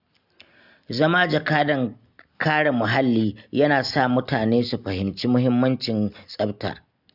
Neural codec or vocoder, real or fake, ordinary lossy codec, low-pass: none; real; Opus, 64 kbps; 5.4 kHz